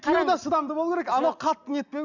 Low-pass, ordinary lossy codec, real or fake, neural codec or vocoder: 7.2 kHz; none; real; none